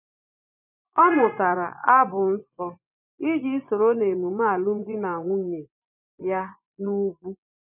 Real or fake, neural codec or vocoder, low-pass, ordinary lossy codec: fake; vocoder, 24 kHz, 100 mel bands, Vocos; 3.6 kHz; none